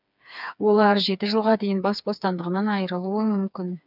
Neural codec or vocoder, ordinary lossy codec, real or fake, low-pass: codec, 16 kHz, 4 kbps, FreqCodec, smaller model; none; fake; 5.4 kHz